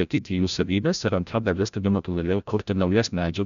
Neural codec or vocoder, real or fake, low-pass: codec, 16 kHz, 0.5 kbps, FreqCodec, larger model; fake; 7.2 kHz